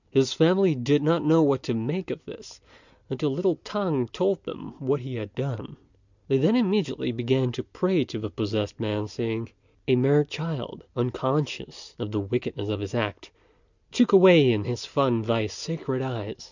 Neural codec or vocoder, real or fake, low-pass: none; real; 7.2 kHz